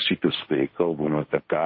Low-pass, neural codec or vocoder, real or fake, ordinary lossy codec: 7.2 kHz; codec, 16 kHz, 1.1 kbps, Voila-Tokenizer; fake; MP3, 24 kbps